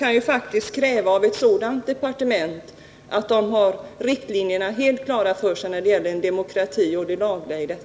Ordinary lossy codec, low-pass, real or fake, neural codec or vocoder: none; none; real; none